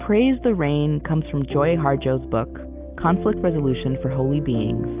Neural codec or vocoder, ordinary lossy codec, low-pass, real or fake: none; Opus, 24 kbps; 3.6 kHz; real